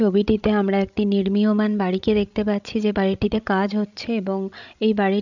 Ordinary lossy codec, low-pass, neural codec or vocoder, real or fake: none; 7.2 kHz; codec, 16 kHz, 16 kbps, FreqCodec, larger model; fake